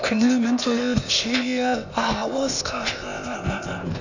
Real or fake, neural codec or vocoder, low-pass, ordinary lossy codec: fake; codec, 16 kHz, 0.8 kbps, ZipCodec; 7.2 kHz; none